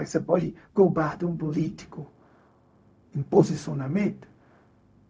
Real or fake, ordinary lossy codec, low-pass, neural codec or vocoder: fake; none; none; codec, 16 kHz, 0.4 kbps, LongCat-Audio-Codec